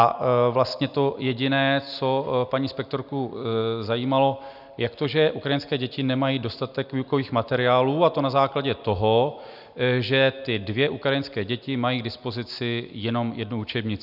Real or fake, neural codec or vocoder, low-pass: real; none; 5.4 kHz